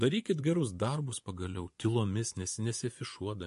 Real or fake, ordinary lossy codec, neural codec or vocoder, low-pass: fake; MP3, 48 kbps; vocoder, 24 kHz, 100 mel bands, Vocos; 10.8 kHz